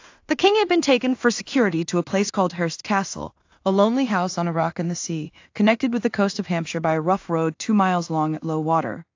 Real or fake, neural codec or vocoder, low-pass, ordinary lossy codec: fake; codec, 16 kHz in and 24 kHz out, 0.4 kbps, LongCat-Audio-Codec, two codebook decoder; 7.2 kHz; AAC, 48 kbps